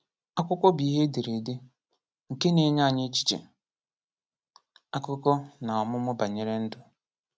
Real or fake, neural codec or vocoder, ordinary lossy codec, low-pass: real; none; none; none